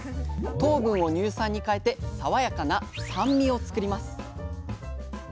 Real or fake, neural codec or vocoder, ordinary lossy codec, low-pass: real; none; none; none